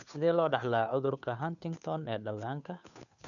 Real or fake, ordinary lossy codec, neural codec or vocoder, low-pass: fake; none; codec, 16 kHz, 2 kbps, FunCodec, trained on Chinese and English, 25 frames a second; 7.2 kHz